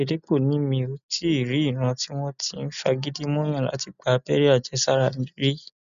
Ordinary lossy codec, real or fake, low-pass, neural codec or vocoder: none; real; 7.2 kHz; none